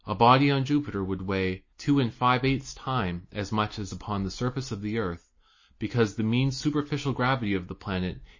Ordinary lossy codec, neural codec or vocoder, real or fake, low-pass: MP3, 32 kbps; none; real; 7.2 kHz